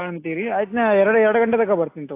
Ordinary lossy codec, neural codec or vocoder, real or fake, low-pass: AAC, 24 kbps; none; real; 3.6 kHz